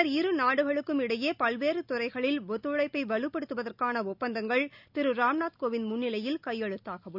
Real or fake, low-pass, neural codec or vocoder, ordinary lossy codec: real; 5.4 kHz; none; none